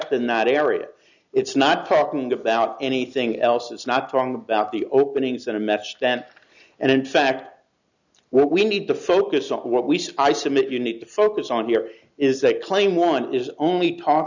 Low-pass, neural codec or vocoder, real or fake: 7.2 kHz; none; real